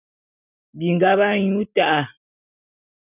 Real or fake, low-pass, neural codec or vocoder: real; 3.6 kHz; none